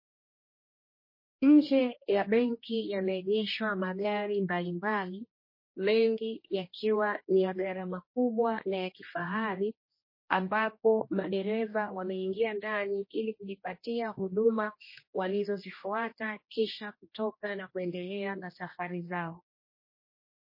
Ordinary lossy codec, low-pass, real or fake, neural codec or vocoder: MP3, 24 kbps; 5.4 kHz; fake; codec, 16 kHz, 1 kbps, X-Codec, HuBERT features, trained on general audio